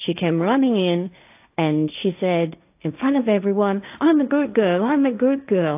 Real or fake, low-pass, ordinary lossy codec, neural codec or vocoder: fake; 3.6 kHz; AAC, 32 kbps; codec, 16 kHz, 1.1 kbps, Voila-Tokenizer